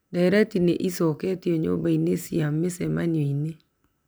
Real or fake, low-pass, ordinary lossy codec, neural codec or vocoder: real; none; none; none